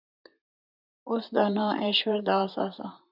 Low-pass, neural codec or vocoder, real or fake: 5.4 kHz; vocoder, 44.1 kHz, 128 mel bands, Pupu-Vocoder; fake